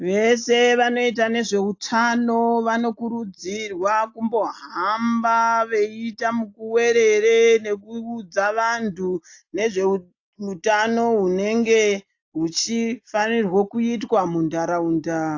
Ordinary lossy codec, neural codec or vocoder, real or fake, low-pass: AAC, 48 kbps; none; real; 7.2 kHz